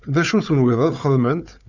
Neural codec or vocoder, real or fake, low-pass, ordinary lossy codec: codec, 16 kHz, 4 kbps, FunCodec, trained on Chinese and English, 50 frames a second; fake; 7.2 kHz; Opus, 64 kbps